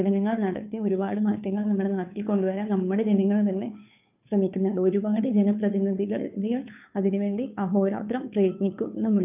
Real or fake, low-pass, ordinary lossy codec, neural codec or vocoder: fake; 3.6 kHz; none; codec, 16 kHz, 2 kbps, FunCodec, trained on Chinese and English, 25 frames a second